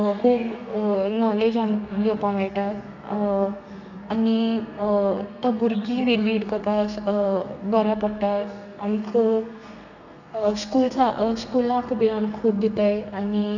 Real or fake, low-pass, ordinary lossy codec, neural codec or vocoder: fake; 7.2 kHz; none; codec, 32 kHz, 1.9 kbps, SNAC